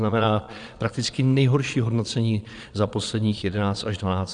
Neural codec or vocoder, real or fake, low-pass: vocoder, 22.05 kHz, 80 mel bands, Vocos; fake; 9.9 kHz